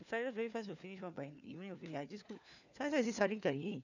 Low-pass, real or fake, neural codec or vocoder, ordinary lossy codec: 7.2 kHz; fake; codec, 16 kHz, 4 kbps, FunCodec, trained on LibriTTS, 50 frames a second; none